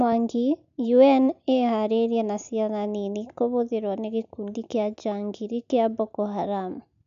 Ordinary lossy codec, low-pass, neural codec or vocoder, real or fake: MP3, 96 kbps; 7.2 kHz; none; real